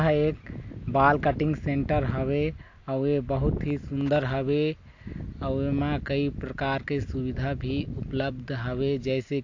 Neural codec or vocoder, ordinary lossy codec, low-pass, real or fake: none; AAC, 48 kbps; 7.2 kHz; real